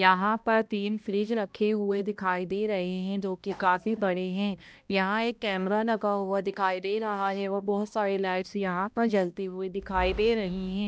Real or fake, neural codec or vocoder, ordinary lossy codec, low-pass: fake; codec, 16 kHz, 1 kbps, X-Codec, HuBERT features, trained on balanced general audio; none; none